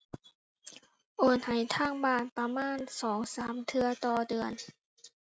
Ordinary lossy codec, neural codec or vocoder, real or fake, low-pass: none; none; real; none